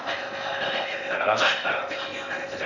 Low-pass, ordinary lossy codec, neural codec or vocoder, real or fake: 7.2 kHz; none; codec, 16 kHz in and 24 kHz out, 0.6 kbps, FocalCodec, streaming, 4096 codes; fake